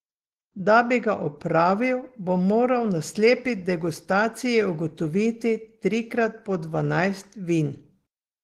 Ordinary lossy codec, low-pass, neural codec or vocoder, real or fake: Opus, 16 kbps; 14.4 kHz; none; real